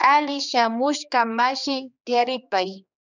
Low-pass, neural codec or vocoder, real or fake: 7.2 kHz; codec, 16 kHz, 2 kbps, X-Codec, HuBERT features, trained on general audio; fake